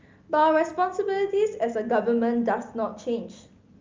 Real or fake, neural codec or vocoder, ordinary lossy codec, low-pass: real; none; Opus, 32 kbps; 7.2 kHz